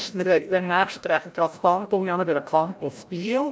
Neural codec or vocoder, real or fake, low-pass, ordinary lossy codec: codec, 16 kHz, 0.5 kbps, FreqCodec, larger model; fake; none; none